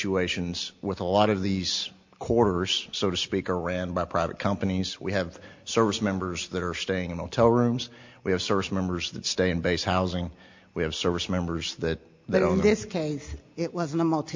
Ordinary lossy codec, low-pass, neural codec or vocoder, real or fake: MP3, 48 kbps; 7.2 kHz; none; real